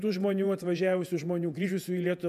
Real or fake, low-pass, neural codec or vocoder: fake; 14.4 kHz; vocoder, 48 kHz, 128 mel bands, Vocos